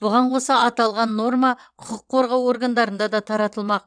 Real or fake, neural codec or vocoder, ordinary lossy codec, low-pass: fake; vocoder, 22.05 kHz, 80 mel bands, WaveNeXt; none; 9.9 kHz